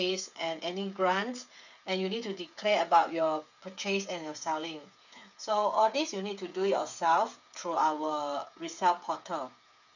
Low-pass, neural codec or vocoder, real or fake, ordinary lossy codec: 7.2 kHz; codec, 16 kHz, 8 kbps, FreqCodec, smaller model; fake; none